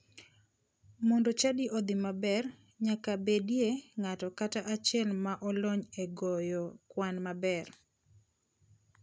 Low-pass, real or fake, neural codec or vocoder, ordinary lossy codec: none; real; none; none